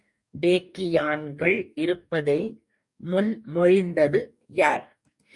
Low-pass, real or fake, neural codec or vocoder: 10.8 kHz; fake; codec, 44.1 kHz, 2.6 kbps, DAC